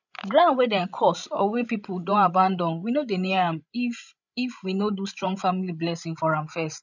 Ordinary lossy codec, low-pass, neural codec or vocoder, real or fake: none; 7.2 kHz; codec, 16 kHz, 16 kbps, FreqCodec, larger model; fake